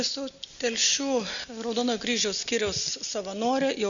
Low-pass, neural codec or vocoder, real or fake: 7.2 kHz; none; real